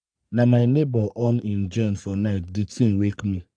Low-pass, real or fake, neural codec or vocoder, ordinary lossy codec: 9.9 kHz; fake; codec, 44.1 kHz, 3.4 kbps, Pupu-Codec; AAC, 64 kbps